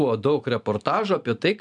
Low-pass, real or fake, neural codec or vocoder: 10.8 kHz; real; none